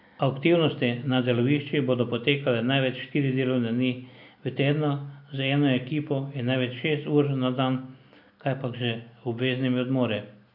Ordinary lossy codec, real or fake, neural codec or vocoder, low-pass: none; real; none; 5.4 kHz